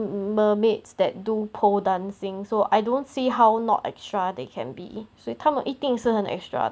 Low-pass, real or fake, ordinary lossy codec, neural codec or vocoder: none; real; none; none